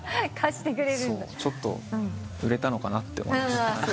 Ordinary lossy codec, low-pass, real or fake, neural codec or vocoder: none; none; real; none